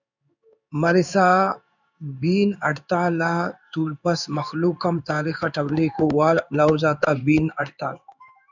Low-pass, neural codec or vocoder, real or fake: 7.2 kHz; codec, 16 kHz in and 24 kHz out, 1 kbps, XY-Tokenizer; fake